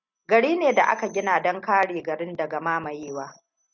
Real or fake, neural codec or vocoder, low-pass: real; none; 7.2 kHz